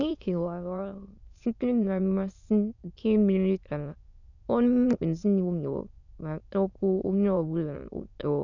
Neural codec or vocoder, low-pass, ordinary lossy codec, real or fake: autoencoder, 22.05 kHz, a latent of 192 numbers a frame, VITS, trained on many speakers; 7.2 kHz; none; fake